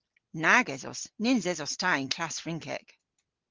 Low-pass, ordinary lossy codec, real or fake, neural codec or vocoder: 7.2 kHz; Opus, 16 kbps; real; none